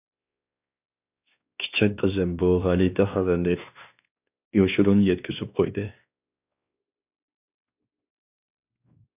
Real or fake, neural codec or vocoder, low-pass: fake; codec, 16 kHz, 2 kbps, X-Codec, WavLM features, trained on Multilingual LibriSpeech; 3.6 kHz